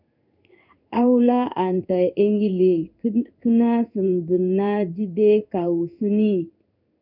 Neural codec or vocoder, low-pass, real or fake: codec, 16 kHz in and 24 kHz out, 1 kbps, XY-Tokenizer; 5.4 kHz; fake